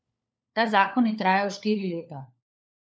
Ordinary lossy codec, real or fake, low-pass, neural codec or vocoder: none; fake; none; codec, 16 kHz, 4 kbps, FunCodec, trained on LibriTTS, 50 frames a second